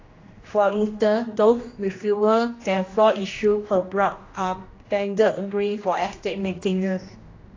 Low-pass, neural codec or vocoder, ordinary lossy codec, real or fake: 7.2 kHz; codec, 16 kHz, 1 kbps, X-Codec, HuBERT features, trained on general audio; AAC, 32 kbps; fake